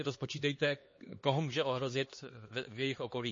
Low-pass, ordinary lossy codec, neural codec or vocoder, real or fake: 7.2 kHz; MP3, 32 kbps; codec, 16 kHz, 2 kbps, X-Codec, HuBERT features, trained on LibriSpeech; fake